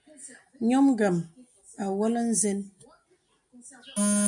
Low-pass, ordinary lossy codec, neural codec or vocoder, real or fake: 10.8 kHz; MP3, 96 kbps; none; real